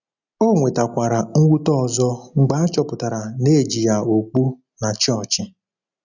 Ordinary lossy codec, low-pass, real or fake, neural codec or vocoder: none; 7.2 kHz; real; none